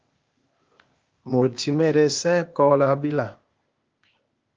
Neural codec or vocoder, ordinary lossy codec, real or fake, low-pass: codec, 16 kHz, 0.8 kbps, ZipCodec; Opus, 24 kbps; fake; 7.2 kHz